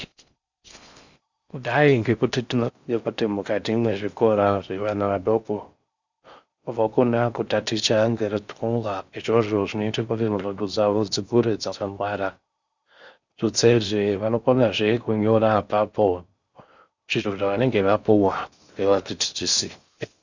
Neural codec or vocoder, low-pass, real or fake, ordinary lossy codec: codec, 16 kHz in and 24 kHz out, 0.6 kbps, FocalCodec, streaming, 4096 codes; 7.2 kHz; fake; Opus, 64 kbps